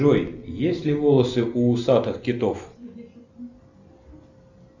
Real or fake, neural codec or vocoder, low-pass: real; none; 7.2 kHz